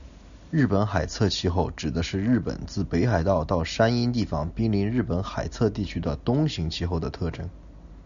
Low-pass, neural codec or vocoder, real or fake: 7.2 kHz; none; real